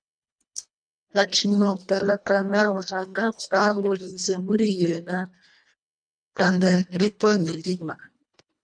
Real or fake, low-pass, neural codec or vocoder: fake; 9.9 kHz; codec, 24 kHz, 1.5 kbps, HILCodec